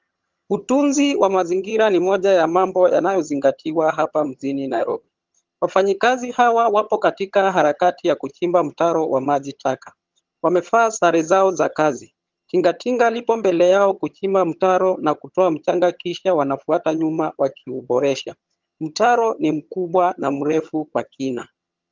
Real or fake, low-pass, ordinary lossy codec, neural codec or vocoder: fake; 7.2 kHz; Opus, 32 kbps; vocoder, 22.05 kHz, 80 mel bands, HiFi-GAN